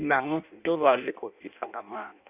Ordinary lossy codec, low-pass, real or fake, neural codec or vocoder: none; 3.6 kHz; fake; codec, 16 kHz in and 24 kHz out, 0.6 kbps, FireRedTTS-2 codec